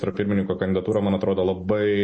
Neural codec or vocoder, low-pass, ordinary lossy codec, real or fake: none; 10.8 kHz; MP3, 32 kbps; real